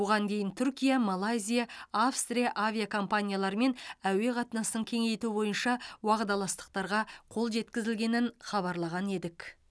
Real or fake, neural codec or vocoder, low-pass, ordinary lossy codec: real; none; none; none